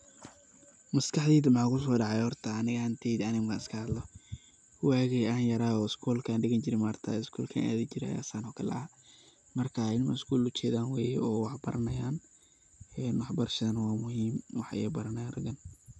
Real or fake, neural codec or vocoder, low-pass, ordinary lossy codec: real; none; none; none